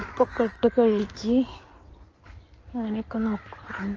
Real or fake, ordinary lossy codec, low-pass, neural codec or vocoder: fake; Opus, 24 kbps; 7.2 kHz; codec, 16 kHz in and 24 kHz out, 2.2 kbps, FireRedTTS-2 codec